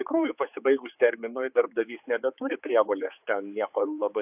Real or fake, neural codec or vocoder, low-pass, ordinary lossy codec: fake; codec, 16 kHz, 4 kbps, X-Codec, HuBERT features, trained on general audio; 3.6 kHz; AAC, 32 kbps